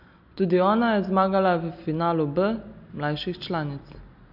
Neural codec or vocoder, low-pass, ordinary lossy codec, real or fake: none; 5.4 kHz; none; real